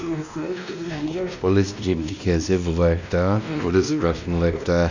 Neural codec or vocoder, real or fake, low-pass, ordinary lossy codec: codec, 16 kHz, 1 kbps, X-Codec, WavLM features, trained on Multilingual LibriSpeech; fake; 7.2 kHz; none